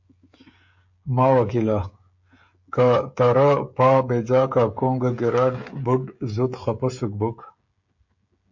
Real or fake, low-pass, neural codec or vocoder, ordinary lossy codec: fake; 7.2 kHz; codec, 16 kHz, 16 kbps, FreqCodec, smaller model; MP3, 48 kbps